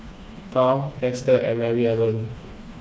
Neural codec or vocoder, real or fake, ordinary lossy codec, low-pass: codec, 16 kHz, 2 kbps, FreqCodec, smaller model; fake; none; none